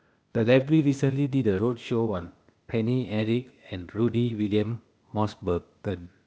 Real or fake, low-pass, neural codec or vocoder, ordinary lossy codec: fake; none; codec, 16 kHz, 0.8 kbps, ZipCodec; none